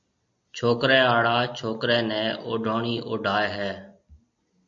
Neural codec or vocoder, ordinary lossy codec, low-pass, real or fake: none; MP3, 48 kbps; 7.2 kHz; real